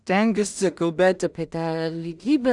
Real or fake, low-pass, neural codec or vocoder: fake; 10.8 kHz; codec, 16 kHz in and 24 kHz out, 0.4 kbps, LongCat-Audio-Codec, two codebook decoder